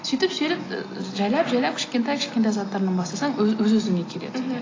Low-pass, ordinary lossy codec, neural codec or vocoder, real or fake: 7.2 kHz; AAC, 32 kbps; none; real